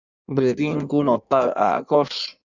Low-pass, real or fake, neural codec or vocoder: 7.2 kHz; fake; codec, 16 kHz in and 24 kHz out, 1.1 kbps, FireRedTTS-2 codec